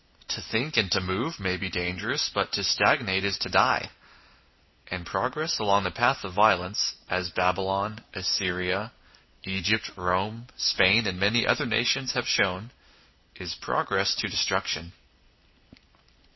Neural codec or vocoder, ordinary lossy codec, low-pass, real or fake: none; MP3, 24 kbps; 7.2 kHz; real